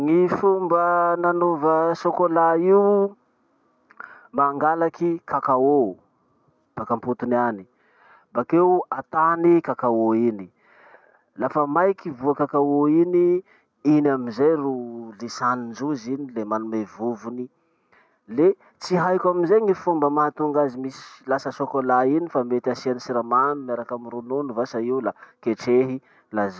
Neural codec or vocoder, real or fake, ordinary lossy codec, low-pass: none; real; none; none